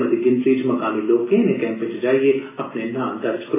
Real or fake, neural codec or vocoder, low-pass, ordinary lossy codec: real; none; 3.6 kHz; AAC, 16 kbps